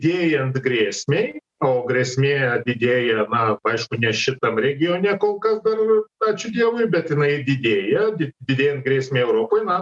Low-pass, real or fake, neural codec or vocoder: 10.8 kHz; real; none